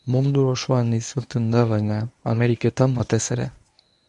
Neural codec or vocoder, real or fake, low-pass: codec, 24 kHz, 0.9 kbps, WavTokenizer, medium speech release version 1; fake; 10.8 kHz